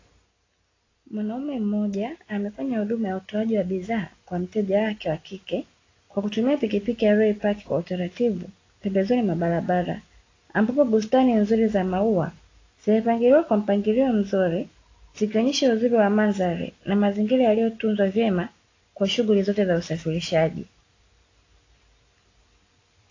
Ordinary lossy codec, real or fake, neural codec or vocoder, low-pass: AAC, 32 kbps; real; none; 7.2 kHz